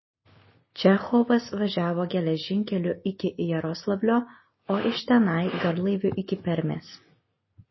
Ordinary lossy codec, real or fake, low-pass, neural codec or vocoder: MP3, 24 kbps; real; 7.2 kHz; none